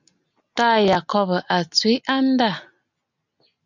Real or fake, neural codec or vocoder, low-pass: real; none; 7.2 kHz